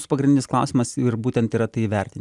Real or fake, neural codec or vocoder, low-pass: real; none; 10.8 kHz